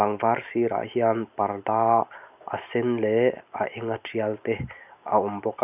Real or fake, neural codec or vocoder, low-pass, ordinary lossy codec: real; none; 3.6 kHz; none